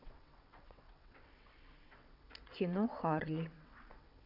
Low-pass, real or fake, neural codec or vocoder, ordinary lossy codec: 5.4 kHz; fake; vocoder, 22.05 kHz, 80 mel bands, WaveNeXt; none